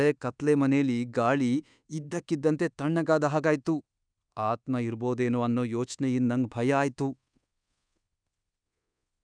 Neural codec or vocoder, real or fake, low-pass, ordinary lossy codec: codec, 24 kHz, 1.2 kbps, DualCodec; fake; 9.9 kHz; none